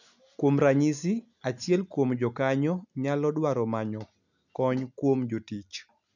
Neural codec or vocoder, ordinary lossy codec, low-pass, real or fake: none; none; 7.2 kHz; real